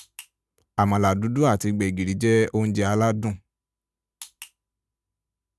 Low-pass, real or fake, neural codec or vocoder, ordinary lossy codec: none; real; none; none